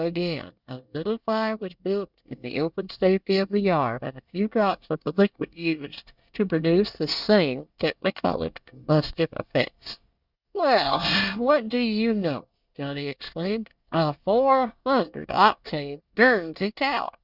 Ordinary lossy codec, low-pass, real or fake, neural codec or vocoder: Opus, 64 kbps; 5.4 kHz; fake; codec, 24 kHz, 1 kbps, SNAC